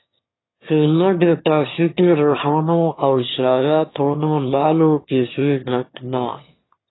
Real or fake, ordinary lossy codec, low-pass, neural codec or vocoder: fake; AAC, 16 kbps; 7.2 kHz; autoencoder, 22.05 kHz, a latent of 192 numbers a frame, VITS, trained on one speaker